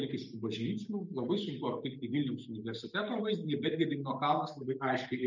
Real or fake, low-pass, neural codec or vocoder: real; 7.2 kHz; none